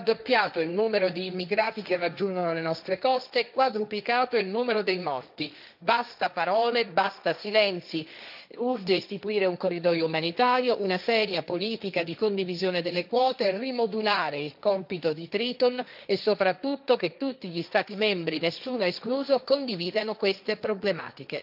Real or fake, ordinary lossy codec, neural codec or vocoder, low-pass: fake; none; codec, 16 kHz, 1.1 kbps, Voila-Tokenizer; 5.4 kHz